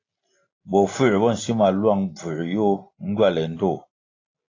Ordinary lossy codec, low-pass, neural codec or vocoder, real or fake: AAC, 32 kbps; 7.2 kHz; none; real